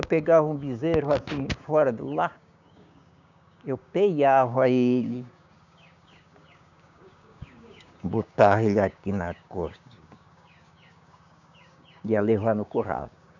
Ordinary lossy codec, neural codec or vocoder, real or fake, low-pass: none; codec, 16 kHz, 6 kbps, DAC; fake; 7.2 kHz